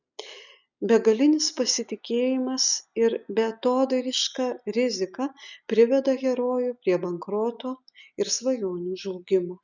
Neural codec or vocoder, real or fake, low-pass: none; real; 7.2 kHz